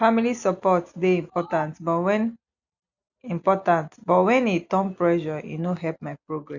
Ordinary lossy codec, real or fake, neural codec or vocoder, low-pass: none; real; none; 7.2 kHz